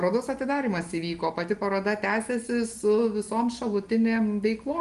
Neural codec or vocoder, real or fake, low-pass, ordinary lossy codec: none; real; 10.8 kHz; Opus, 24 kbps